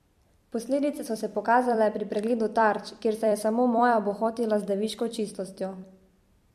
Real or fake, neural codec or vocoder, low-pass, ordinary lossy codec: fake; vocoder, 44.1 kHz, 128 mel bands every 256 samples, BigVGAN v2; 14.4 kHz; MP3, 64 kbps